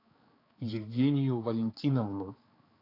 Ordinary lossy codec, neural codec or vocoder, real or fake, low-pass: AAC, 24 kbps; codec, 16 kHz, 4 kbps, X-Codec, HuBERT features, trained on balanced general audio; fake; 5.4 kHz